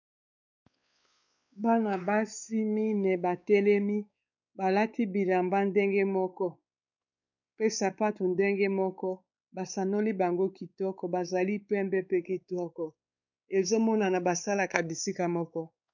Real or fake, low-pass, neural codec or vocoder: fake; 7.2 kHz; codec, 16 kHz, 4 kbps, X-Codec, WavLM features, trained on Multilingual LibriSpeech